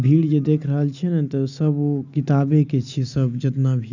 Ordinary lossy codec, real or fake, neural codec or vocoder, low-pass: none; real; none; 7.2 kHz